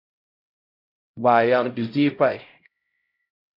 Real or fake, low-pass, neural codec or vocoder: fake; 5.4 kHz; codec, 16 kHz, 0.5 kbps, X-Codec, HuBERT features, trained on LibriSpeech